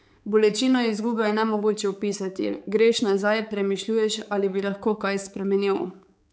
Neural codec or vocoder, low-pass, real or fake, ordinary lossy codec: codec, 16 kHz, 4 kbps, X-Codec, HuBERT features, trained on balanced general audio; none; fake; none